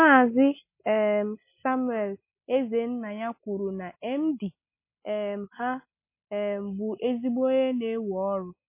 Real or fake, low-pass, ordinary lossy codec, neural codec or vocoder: real; 3.6 kHz; MP3, 24 kbps; none